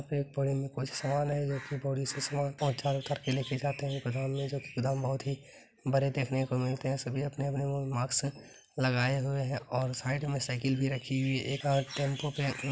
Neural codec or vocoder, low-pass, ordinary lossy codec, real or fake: none; none; none; real